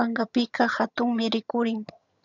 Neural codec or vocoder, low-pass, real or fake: vocoder, 22.05 kHz, 80 mel bands, HiFi-GAN; 7.2 kHz; fake